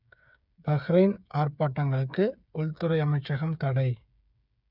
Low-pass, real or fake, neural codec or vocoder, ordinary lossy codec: 5.4 kHz; fake; codec, 16 kHz, 8 kbps, FreqCodec, smaller model; none